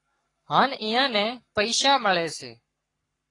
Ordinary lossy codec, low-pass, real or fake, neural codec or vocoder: AAC, 32 kbps; 10.8 kHz; fake; codec, 44.1 kHz, 7.8 kbps, Pupu-Codec